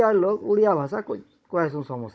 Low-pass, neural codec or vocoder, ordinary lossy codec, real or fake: none; codec, 16 kHz, 16 kbps, FunCodec, trained on Chinese and English, 50 frames a second; none; fake